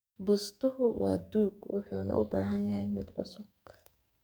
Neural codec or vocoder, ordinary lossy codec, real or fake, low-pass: codec, 44.1 kHz, 2.6 kbps, SNAC; none; fake; none